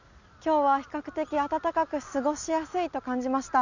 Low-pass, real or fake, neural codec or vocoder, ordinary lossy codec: 7.2 kHz; real; none; none